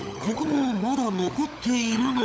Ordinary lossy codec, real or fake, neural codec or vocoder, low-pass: none; fake; codec, 16 kHz, 16 kbps, FunCodec, trained on LibriTTS, 50 frames a second; none